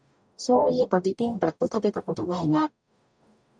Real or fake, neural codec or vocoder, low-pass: fake; codec, 44.1 kHz, 0.9 kbps, DAC; 9.9 kHz